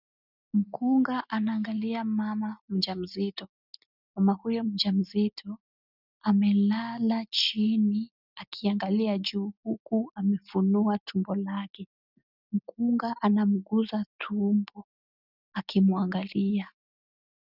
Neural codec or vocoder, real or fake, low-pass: none; real; 5.4 kHz